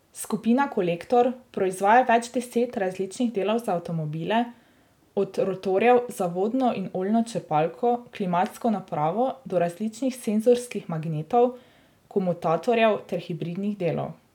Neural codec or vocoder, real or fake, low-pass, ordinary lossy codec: none; real; 19.8 kHz; none